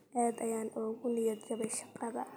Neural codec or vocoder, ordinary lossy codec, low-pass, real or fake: none; none; none; real